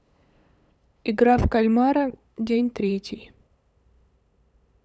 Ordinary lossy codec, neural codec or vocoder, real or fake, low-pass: none; codec, 16 kHz, 8 kbps, FunCodec, trained on LibriTTS, 25 frames a second; fake; none